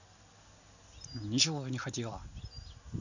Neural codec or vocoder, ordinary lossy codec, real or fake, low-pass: none; none; real; 7.2 kHz